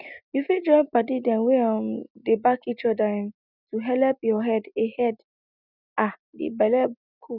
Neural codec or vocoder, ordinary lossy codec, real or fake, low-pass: none; none; real; 5.4 kHz